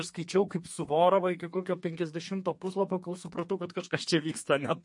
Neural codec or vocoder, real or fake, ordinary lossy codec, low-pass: codec, 32 kHz, 1.9 kbps, SNAC; fake; MP3, 48 kbps; 10.8 kHz